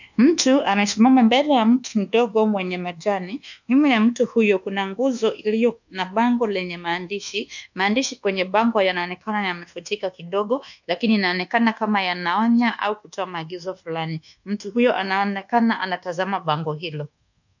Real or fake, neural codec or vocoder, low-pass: fake; codec, 24 kHz, 1.2 kbps, DualCodec; 7.2 kHz